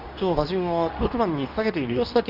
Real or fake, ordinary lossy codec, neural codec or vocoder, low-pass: fake; Opus, 32 kbps; codec, 24 kHz, 0.9 kbps, WavTokenizer, medium speech release version 2; 5.4 kHz